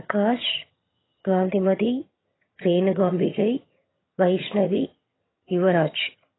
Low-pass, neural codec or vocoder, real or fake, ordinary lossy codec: 7.2 kHz; vocoder, 22.05 kHz, 80 mel bands, HiFi-GAN; fake; AAC, 16 kbps